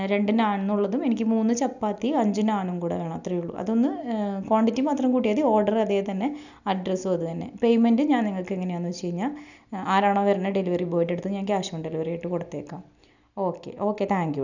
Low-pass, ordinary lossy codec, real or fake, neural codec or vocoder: 7.2 kHz; none; real; none